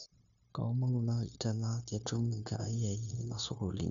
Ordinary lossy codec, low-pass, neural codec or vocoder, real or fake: none; 7.2 kHz; codec, 16 kHz, 0.9 kbps, LongCat-Audio-Codec; fake